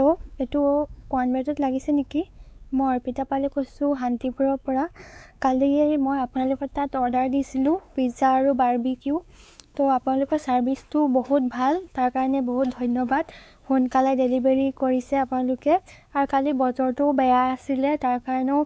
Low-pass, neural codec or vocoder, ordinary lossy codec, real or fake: none; codec, 16 kHz, 4 kbps, X-Codec, WavLM features, trained on Multilingual LibriSpeech; none; fake